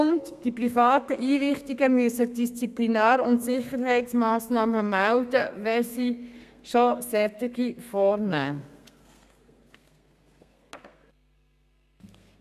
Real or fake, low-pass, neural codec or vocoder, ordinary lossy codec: fake; 14.4 kHz; codec, 32 kHz, 1.9 kbps, SNAC; none